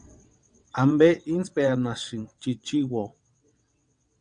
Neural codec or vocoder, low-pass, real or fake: vocoder, 22.05 kHz, 80 mel bands, WaveNeXt; 9.9 kHz; fake